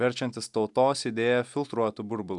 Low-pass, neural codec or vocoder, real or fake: 10.8 kHz; none; real